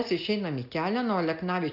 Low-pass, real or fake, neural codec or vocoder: 5.4 kHz; real; none